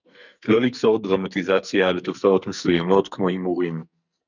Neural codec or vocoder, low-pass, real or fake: codec, 44.1 kHz, 2.6 kbps, SNAC; 7.2 kHz; fake